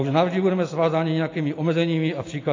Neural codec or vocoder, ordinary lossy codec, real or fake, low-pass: none; AAC, 32 kbps; real; 7.2 kHz